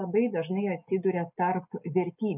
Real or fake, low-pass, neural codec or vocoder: real; 3.6 kHz; none